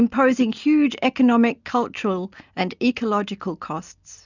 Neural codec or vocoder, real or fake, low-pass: none; real; 7.2 kHz